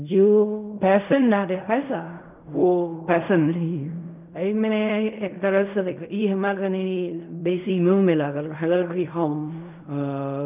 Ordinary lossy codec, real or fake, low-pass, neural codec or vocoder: none; fake; 3.6 kHz; codec, 16 kHz in and 24 kHz out, 0.4 kbps, LongCat-Audio-Codec, fine tuned four codebook decoder